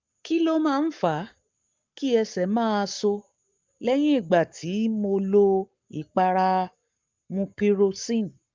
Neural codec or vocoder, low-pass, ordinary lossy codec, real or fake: codec, 44.1 kHz, 7.8 kbps, Pupu-Codec; 7.2 kHz; Opus, 24 kbps; fake